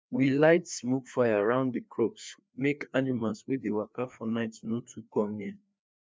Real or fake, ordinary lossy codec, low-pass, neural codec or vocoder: fake; none; none; codec, 16 kHz, 2 kbps, FreqCodec, larger model